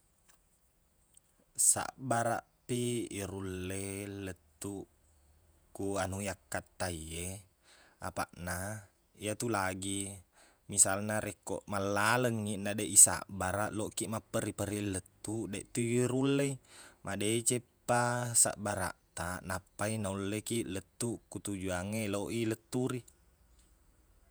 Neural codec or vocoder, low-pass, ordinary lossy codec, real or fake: vocoder, 48 kHz, 128 mel bands, Vocos; none; none; fake